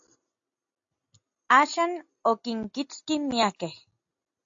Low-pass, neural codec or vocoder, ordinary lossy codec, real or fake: 7.2 kHz; none; AAC, 64 kbps; real